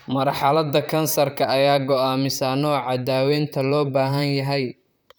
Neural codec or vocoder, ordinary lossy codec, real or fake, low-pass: vocoder, 44.1 kHz, 128 mel bands, Pupu-Vocoder; none; fake; none